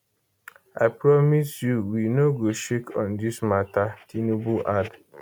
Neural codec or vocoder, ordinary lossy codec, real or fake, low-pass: none; none; real; none